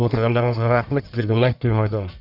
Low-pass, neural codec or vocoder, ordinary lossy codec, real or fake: 5.4 kHz; codec, 44.1 kHz, 1.7 kbps, Pupu-Codec; none; fake